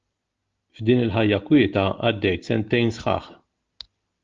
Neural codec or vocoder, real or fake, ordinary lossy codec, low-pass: none; real; Opus, 32 kbps; 7.2 kHz